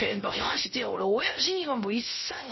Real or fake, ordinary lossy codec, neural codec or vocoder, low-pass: fake; MP3, 24 kbps; codec, 16 kHz, 0.3 kbps, FocalCodec; 7.2 kHz